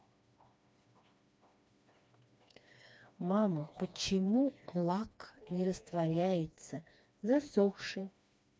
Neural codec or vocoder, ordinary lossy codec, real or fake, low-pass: codec, 16 kHz, 2 kbps, FreqCodec, smaller model; none; fake; none